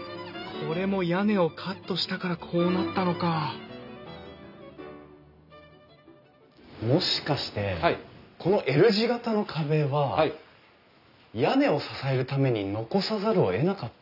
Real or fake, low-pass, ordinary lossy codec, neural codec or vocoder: real; 5.4 kHz; none; none